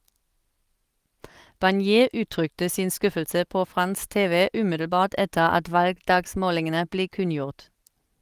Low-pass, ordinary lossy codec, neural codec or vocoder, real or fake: 14.4 kHz; Opus, 24 kbps; none; real